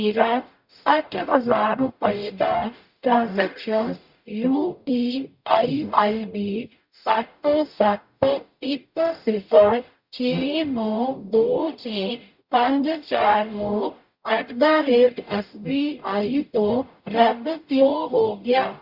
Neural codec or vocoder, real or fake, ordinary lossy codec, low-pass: codec, 44.1 kHz, 0.9 kbps, DAC; fake; Opus, 64 kbps; 5.4 kHz